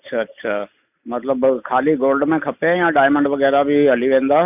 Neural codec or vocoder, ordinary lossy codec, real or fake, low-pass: none; none; real; 3.6 kHz